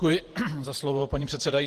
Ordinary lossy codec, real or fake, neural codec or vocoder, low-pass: Opus, 16 kbps; fake; vocoder, 48 kHz, 128 mel bands, Vocos; 14.4 kHz